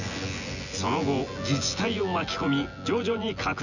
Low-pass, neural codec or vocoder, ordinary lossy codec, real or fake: 7.2 kHz; vocoder, 24 kHz, 100 mel bands, Vocos; none; fake